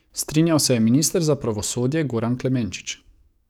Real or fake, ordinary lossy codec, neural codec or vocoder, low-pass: fake; none; codec, 44.1 kHz, 7.8 kbps, DAC; 19.8 kHz